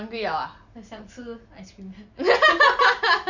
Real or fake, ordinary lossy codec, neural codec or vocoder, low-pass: real; none; none; 7.2 kHz